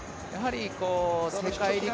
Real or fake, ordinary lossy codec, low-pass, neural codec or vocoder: real; none; none; none